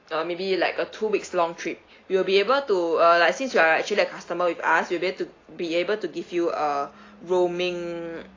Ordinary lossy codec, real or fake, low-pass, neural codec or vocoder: AAC, 32 kbps; real; 7.2 kHz; none